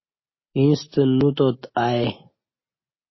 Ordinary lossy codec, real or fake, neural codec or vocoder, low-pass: MP3, 24 kbps; real; none; 7.2 kHz